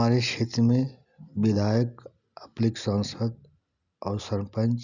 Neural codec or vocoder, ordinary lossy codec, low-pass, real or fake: none; none; 7.2 kHz; real